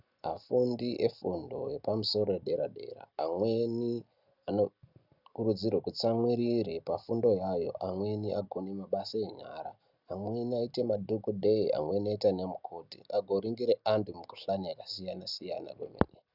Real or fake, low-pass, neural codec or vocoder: real; 5.4 kHz; none